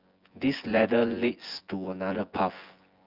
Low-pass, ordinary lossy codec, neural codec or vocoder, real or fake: 5.4 kHz; Opus, 24 kbps; vocoder, 24 kHz, 100 mel bands, Vocos; fake